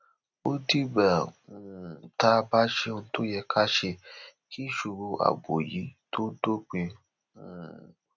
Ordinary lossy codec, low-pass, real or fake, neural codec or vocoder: none; 7.2 kHz; real; none